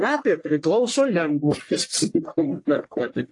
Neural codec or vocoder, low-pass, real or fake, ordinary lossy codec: codec, 44.1 kHz, 1.7 kbps, Pupu-Codec; 10.8 kHz; fake; AAC, 48 kbps